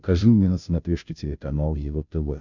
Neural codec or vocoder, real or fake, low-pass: codec, 16 kHz, 0.5 kbps, FunCodec, trained on Chinese and English, 25 frames a second; fake; 7.2 kHz